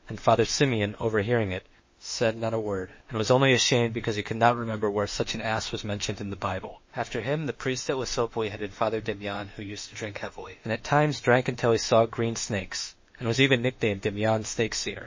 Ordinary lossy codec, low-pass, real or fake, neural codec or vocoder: MP3, 32 kbps; 7.2 kHz; fake; autoencoder, 48 kHz, 32 numbers a frame, DAC-VAE, trained on Japanese speech